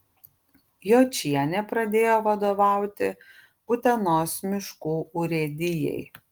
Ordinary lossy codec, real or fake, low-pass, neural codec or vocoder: Opus, 24 kbps; real; 19.8 kHz; none